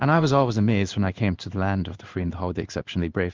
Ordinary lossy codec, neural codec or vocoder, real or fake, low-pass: Opus, 24 kbps; codec, 16 kHz in and 24 kHz out, 1 kbps, XY-Tokenizer; fake; 7.2 kHz